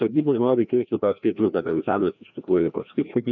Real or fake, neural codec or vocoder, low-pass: fake; codec, 16 kHz, 1 kbps, FreqCodec, larger model; 7.2 kHz